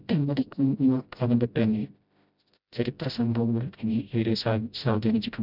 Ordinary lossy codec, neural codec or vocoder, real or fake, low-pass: none; codec, 16 kHz, 0.5 kbps, FreqCodec, smaller model; fake; 5.4 kHz